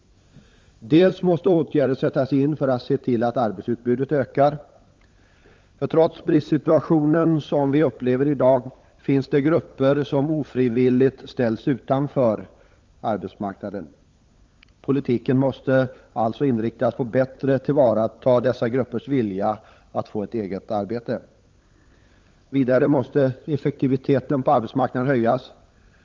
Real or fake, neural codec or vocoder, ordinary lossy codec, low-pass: fake; codec, 16 kHz, 16 kbps, FunCodec, trained on LibriTTS, 50 frames a second; Opus, 32 kbps; 7.2 kHz